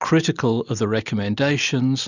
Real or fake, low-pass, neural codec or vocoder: real; 7.2 kHz; none